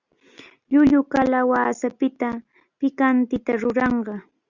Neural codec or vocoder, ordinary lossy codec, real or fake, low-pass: none; Opus, 64 kbps; real; 7.2 kHz